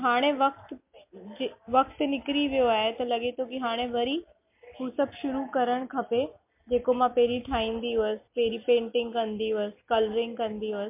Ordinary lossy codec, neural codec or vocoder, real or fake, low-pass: MP3, 32 kbps; none; real; 3.6 kHz